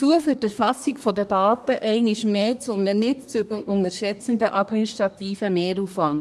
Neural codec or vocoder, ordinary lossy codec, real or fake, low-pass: codec, 24 kHz, 1 kbps, SNAC; none; fake; none